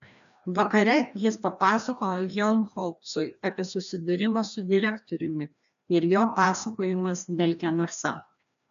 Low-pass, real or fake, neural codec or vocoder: 7.2 kHz; fake; codec, 16 kHz, 1 kbps, FreqCodec, larger model